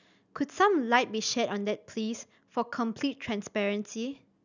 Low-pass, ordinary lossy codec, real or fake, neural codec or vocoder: 7.2 kHz; none; real; none